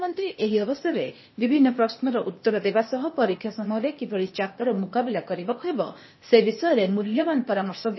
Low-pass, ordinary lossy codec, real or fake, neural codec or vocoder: 7.2 kHz; MP3, 24 kbps; fake; codec, 16 kHz, 0.8 kbps, ZipCodec